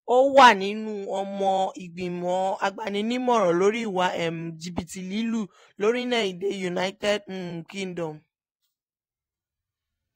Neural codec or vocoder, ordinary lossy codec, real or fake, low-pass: vocoder, 44.1 kHz, 128 mel bands every 512 samples, BigVGAN v2; AAC, 48 kbps; fake; 19.8 kHz